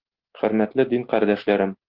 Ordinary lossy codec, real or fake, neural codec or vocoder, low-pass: Opus, 16 kbps; real; none; 5.4 kHz